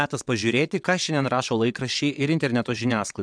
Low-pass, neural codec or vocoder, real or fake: 9.9 kHz; vocoder, 22.05 kHz, 80 mel bands, WaveNeXt; fake